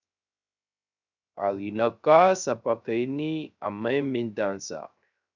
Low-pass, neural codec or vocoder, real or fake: 7.2 kHz; codec, 16 kHz, 0.3 kbps, FocalCodec; fake